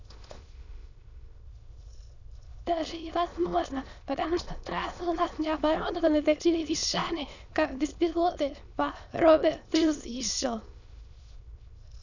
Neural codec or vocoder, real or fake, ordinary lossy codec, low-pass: autoencoder, 22.05 kHz, a latent of 192 numbers a frame, VITS, trained on many speakers; fake; none; 7.2 kHz